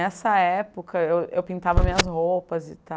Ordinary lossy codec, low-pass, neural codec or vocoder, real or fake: none; none; none; real